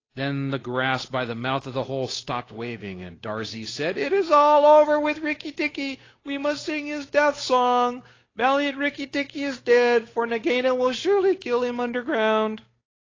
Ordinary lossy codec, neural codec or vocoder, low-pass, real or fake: AAC, 32 kbps; codec, 16 kHz, 8 kbps, FunCodec, trained on Chinese and English, 25 frames a second; 7.2 kHz; fake